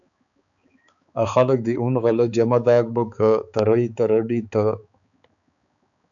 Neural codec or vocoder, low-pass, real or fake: codec, 16 kHz, 4 kbps, X-Codec, HuBERT features, trained on balanced general audio; 7.2 kHz; fake